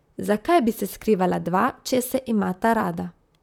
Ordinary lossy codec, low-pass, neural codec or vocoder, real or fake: none; 19.8 kHz; vocoder, 44.1 kHz, 128 mel bands, Pupu-Vocoder; fake